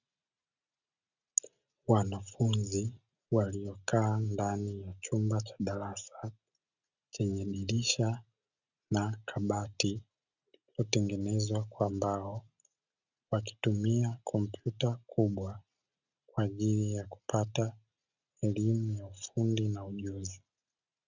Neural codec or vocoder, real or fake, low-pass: none; real; 7.2 kHz